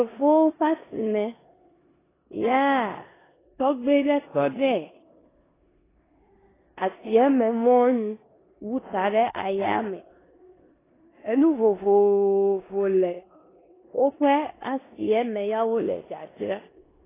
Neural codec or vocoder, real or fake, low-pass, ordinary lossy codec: codec, 16 kHz in and 24 kHz out, 0.9 kbps, LongCat-Audio-Codec, four codebook decoder; fake; 3.6 kHz; AAC, 16 kbps